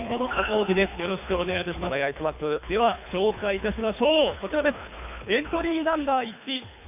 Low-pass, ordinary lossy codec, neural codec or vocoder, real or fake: 3.6 kHz; none; codec, 24 kHz, 3 kbps, HILCodec; fake